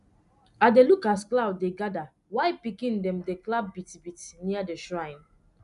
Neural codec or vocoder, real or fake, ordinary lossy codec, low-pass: none; real; none; 10.8 kHz